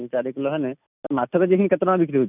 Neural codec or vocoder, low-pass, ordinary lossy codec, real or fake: none; 3.6 kHz; none; real